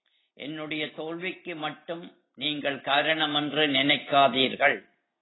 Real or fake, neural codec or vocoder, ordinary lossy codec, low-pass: real; none; AAC, 16 kbps; 7.2 kHz